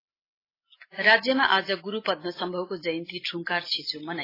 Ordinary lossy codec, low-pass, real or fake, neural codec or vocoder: AAC, 24 kbps; 5.4 kHz; real; none